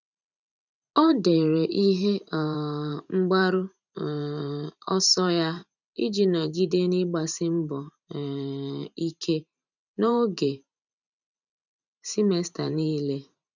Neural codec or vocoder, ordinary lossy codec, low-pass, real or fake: vocoder, 44.1 kHz, 128 mel bands every 512 samples, BigVGAN v2; none; 7.2 kHz; fake